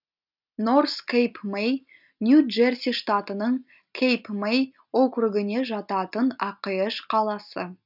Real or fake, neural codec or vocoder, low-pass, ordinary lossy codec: real; none; 5.4 kHz; none